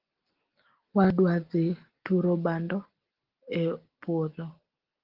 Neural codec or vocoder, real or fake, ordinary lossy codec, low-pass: none; real; Opus, 16 kbps; 5.4 kHz